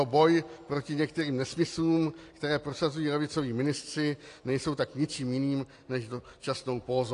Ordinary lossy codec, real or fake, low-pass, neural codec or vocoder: AAC, 48 kbps; real; 10.8 kHz; none